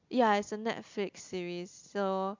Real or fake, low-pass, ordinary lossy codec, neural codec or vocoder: real; 7.2 kHz; MP3, 64 kbps; none